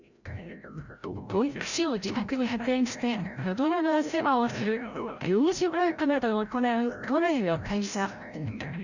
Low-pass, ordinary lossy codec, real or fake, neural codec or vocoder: 7.2 kHz; none; fake; codec, 16 kHz, 0.5 kbps, FreqCodec, larger model